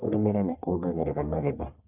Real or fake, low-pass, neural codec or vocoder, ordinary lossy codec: fake; 3.6 kHz; codec, 44.1 kHz, 1.7 kbps, Pupu-Codec; none